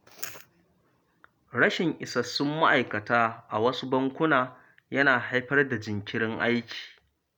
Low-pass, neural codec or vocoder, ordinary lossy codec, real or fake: none; none; none; real